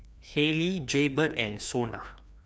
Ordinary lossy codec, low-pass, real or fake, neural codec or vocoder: none; none; fake; codec, 16 kHz, 2 kbps, FreqCodec, larger model